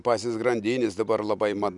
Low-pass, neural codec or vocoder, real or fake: 10.8 kHz; none; real